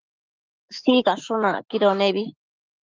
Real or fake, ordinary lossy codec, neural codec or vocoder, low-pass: fake; Opus, 24 kbps; autoencoder, 48 kHz, 128 numbers a frame, DAC-VAE, trained on Japanese speech; 7.2 kHz